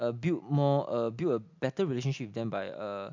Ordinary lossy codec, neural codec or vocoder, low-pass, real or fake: MP3, 64 kbps; none; 7.2 kHz; real